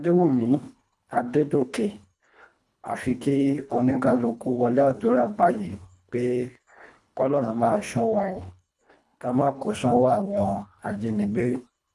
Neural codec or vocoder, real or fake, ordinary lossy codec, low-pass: codec, 24 kHz, 1.5 kbps, HILCodec; fake; none; none